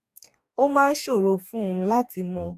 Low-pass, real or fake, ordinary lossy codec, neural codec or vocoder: 14.4 kHz; fake; MP3, 96 kbps; codec, 44.1 kHz, 2.6 kbps, DAC